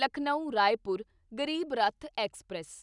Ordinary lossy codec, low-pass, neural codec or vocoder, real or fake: none; 10.8 kHz; none; real